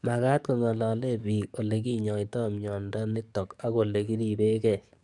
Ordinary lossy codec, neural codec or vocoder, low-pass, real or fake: none; codec, 44.1 kHz, 7.8 kbps, DAC; 10.8 kHz; fake